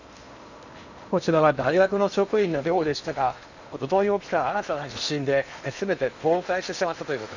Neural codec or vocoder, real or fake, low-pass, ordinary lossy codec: codec, 16 kHz in and 24 kHz out, 0.8 kbps, FocalCodec, streaming, 65536 codes; fake; 7.2 kHz; none